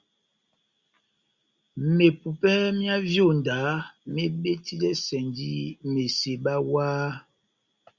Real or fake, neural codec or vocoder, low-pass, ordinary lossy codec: real; none; 7.2 kHz; Opus, 64 kbps